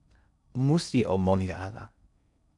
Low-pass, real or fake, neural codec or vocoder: 10.8 kHz; fake; codec, 16 kHz in and 24 kHz out, 0.6 kbps, FocalCodec, streaming, 4096 codes